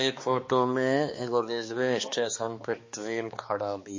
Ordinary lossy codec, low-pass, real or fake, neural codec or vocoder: MP3, 32 kbps; 7.2 kHz; fake; codec, 16 kHz, 2 kbps, X-Codec, HuBERT features, trained on balanced general audio